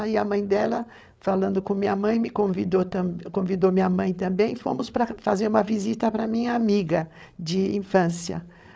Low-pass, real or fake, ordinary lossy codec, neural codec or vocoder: none; fake; none; codec, 16 kHz, 8 kbps, FreqCodec, larger model